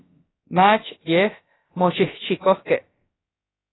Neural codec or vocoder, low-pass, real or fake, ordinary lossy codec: codec, 16 kHz, about 1 kbps, DyCAST, with the encoder's durations; 7.2 kHz; fake; AAC, 16 kbps